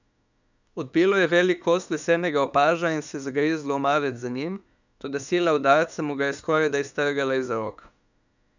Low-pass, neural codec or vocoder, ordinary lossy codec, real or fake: 7.2 kHz; autoencoder, 48 kHz, 32 numbers a frame, DAC-VAE, trained on Japanese speech; none; fake